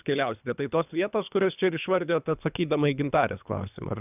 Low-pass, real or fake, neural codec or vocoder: 3.6 kHz; fake; codec, 24 kHz, 3 kbps, HILCodec